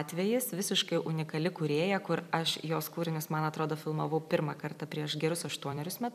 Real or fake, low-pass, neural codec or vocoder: fake; 14.4 kHz; vocoder, 44.1 kHz, 128 mel bands every 256 samples, BigVGAN v2